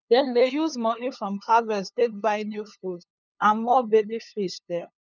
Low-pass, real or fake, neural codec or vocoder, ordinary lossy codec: 7.2 kHz; fake; codec, 16 kHz, 2 kbps, FunCodec, trained on LibriTTS, 25 frames a second; none